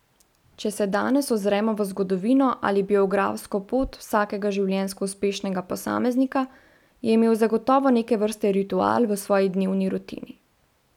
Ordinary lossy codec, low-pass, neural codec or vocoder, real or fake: none; 19.8 kHz; none; real